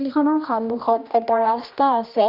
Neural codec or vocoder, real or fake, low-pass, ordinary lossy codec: codec, 16 kHz, 1 kbps, X-Codec, HuBERT features, trained on general audio; fake; 5.4 kHz; none